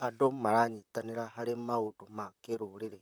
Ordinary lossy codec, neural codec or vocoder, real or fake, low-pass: none; vocoder, 44.1 kHz, 128 mel bands, Pupu-Vocoder; fake; none